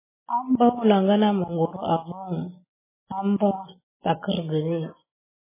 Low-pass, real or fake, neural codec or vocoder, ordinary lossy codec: 3.6 kHz; real; none; MP3, 16 kbps